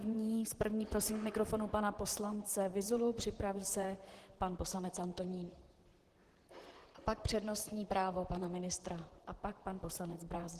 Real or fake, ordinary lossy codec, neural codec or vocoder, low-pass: fake; Opus, 16 kbps; vocoder, 44.1 kHz, 128 mel bands, Pupu-Vocoder; 14.4 kHz